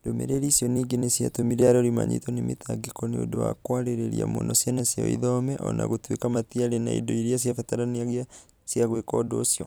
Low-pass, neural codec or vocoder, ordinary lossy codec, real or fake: none; vocoder, 44.1 kHz, 128 mel bands every 256 samples, BigVGAN v2; none; fake